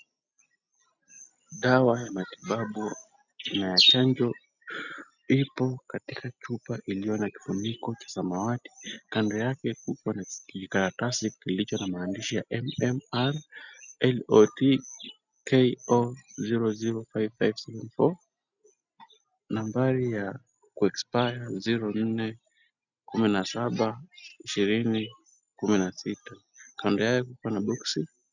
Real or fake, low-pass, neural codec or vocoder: real; 7.2 kHz; none